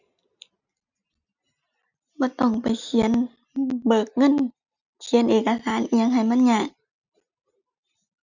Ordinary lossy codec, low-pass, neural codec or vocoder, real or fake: none; 7.2 kHz; none; real